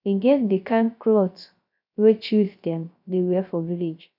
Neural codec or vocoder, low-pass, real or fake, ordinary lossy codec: codec, 16 kHz, 0.3 kbps, FocalCodec; 5.4 kHz; fake; none